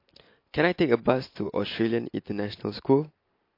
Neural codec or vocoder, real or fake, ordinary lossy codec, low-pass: none; real; MP3, 32 kbps; 5.4 kHz